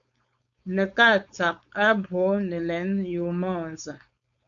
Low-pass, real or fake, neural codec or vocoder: 7.2 kHz; fake; codec, 16 kHz, 4.8 kbps, FACodec